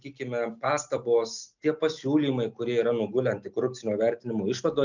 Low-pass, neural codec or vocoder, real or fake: 7.2 kHz; none; real